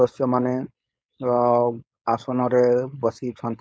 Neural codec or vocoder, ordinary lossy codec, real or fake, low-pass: codec, 16 kHz, 4.8 kbps, FACodec; none; fake; none